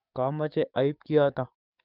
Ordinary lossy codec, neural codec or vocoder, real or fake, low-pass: none; codec, 44.1 kHz, 7.8 kbps, DAC; fake; 5.4 kHz